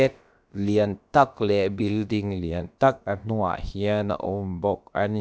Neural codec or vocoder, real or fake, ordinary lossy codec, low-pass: codec, 16 kHz, about 1 kbps, DyCAST, with the encoder's durations; fake; none; none